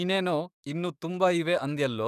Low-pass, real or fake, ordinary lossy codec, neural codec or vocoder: 14.4 kHz; fake; none; codec, 44.1 kHz, 7.8 kbps, DAC